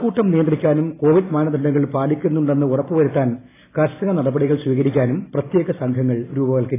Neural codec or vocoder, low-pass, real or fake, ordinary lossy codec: none; 3.6 kHz; real; AAC, 16 kbps